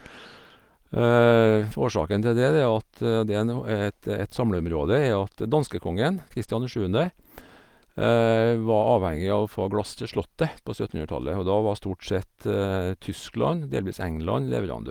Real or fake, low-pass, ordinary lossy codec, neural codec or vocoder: real; 19.8 kHz; Opus, 24 kbps; none